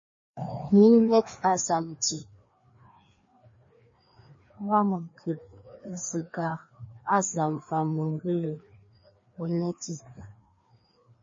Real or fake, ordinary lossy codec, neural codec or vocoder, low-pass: fake; MP3, 32 kbps; codec, 16 kHz, 2 kbps, FreqCodec, larger model; 7.2 kHz